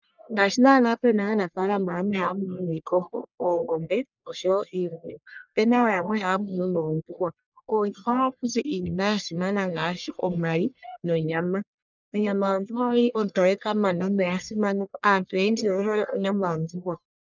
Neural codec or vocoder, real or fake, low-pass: codec, 44.1 kHz, 1.7 kbps, Pupu-Codec; fake; 7.2 kHz